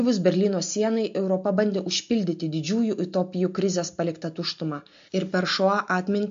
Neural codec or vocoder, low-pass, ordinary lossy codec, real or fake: none; 7.2 kHz; MP3, 48 kbps; real